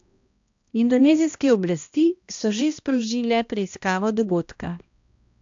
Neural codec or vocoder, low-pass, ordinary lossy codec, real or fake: codec, 16 kHz, 1 kbps, X-Codec, HuBERT features, trained on balanced general audio; 7.2 kHz; AAC, 64 kbps; fake